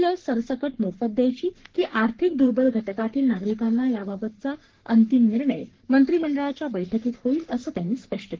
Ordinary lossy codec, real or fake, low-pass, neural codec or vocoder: Opus, 16 kbps; fake; 7.2 kHz; codec, 44.1 kHz, 3.4 kbps, Pupu-Codec